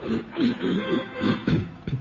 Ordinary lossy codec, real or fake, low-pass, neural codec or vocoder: MP3, 32 kbps; fake; 7.2 kHz; codec, 16 kHz, 2 kbps, FunCodec, trained on Chinese and English, 25 frames a second